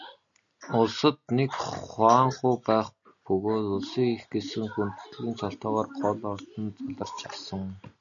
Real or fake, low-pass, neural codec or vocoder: real; 7.2 kHz; none